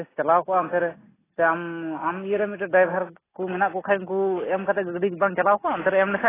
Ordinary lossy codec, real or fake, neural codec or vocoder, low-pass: AAC, 16 kbps; real; none; 3.6 kHz